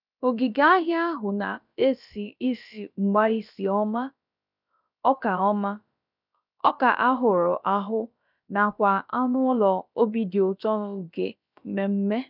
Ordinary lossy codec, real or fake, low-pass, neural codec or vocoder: none; fake; 5.4 kHz; codec, 16 kHz, about 1 kbps, DyCAST, with the encoder's durations